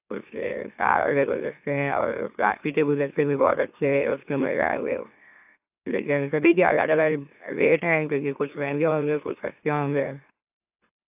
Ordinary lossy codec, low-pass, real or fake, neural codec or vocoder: AAC, 32 kbps; 3.6 kHz; fake; autoencoder, 44.1 kHz, a latent of 192 numbers a frame, MeloTTS